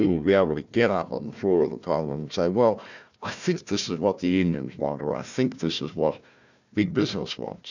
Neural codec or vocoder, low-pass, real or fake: codec, 16 kHz, 1 kbps, FunCodec, trained on Chinese and English, 50 frames a second; 7.2 kHz; fake